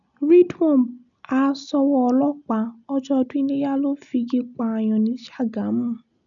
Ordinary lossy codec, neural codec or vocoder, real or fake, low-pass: none; none; real; 7.2 kHz